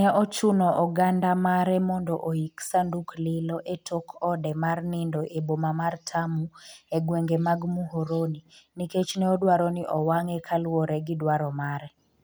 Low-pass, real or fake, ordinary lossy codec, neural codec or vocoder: none; real; none; none